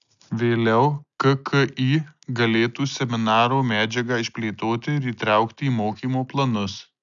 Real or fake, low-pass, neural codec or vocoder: real; 7.2 kHz; none